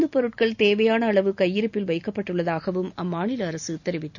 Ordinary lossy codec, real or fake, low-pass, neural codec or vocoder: none; real; 7.2 kHz; none